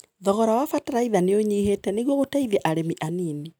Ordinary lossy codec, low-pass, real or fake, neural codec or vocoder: none; none; real; none